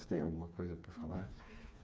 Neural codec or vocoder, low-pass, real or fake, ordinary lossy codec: codec, 16 kHz, 4 kbps, FreqCodec, smaller model; none; fake; none